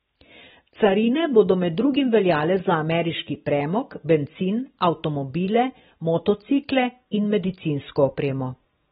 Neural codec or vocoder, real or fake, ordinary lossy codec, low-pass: none; real; AAC, 16 kbps; 14.4 kHz